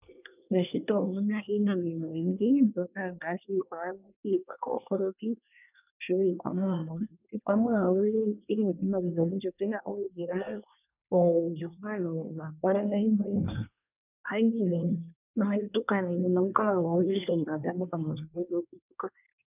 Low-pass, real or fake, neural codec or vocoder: 3.6 kHz; fake; codec, 24 kHz, 1 kbps, SNAC